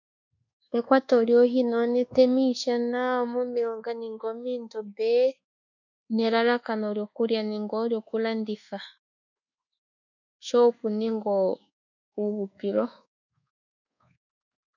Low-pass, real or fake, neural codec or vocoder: 7.2 kHz; fake; codec, 24 kHz, 1.2 kbps, DualCodec